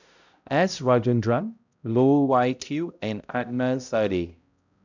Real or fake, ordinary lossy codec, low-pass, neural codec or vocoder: fake; none; 7.2 kHz; codec, 16 kHz, 0.5 kbps, X-Codec, HuBERT features, trained on balanced general audio